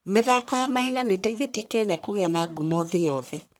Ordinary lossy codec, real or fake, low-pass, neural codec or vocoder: none; fake; none; codec, 44.1 kHz, 1.7 kbps, Pupu-Codec